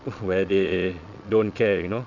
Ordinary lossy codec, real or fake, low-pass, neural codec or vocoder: none; fake; 7.2 kHz; vocoder, 22.05 kHz, 80 mel bands, Vocos